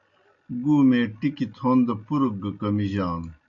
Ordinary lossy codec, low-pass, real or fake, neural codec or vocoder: MP3, 64 kbps; 7.2 kHz; real; none